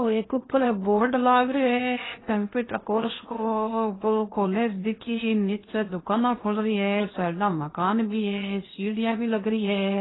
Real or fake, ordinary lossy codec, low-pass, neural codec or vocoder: fake; AAC, 16 kbps; 7.2 kHz; codec, 16 kHz in and 24 kHz out, 0.8 kbps, FocalCodec, streaming, 65536 codes